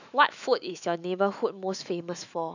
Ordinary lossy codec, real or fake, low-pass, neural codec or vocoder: none; fake; 7.2 kHz; autoencoder, 48 kHz, 128 numbers a frame, DAC-VAE, trained on Japanese speech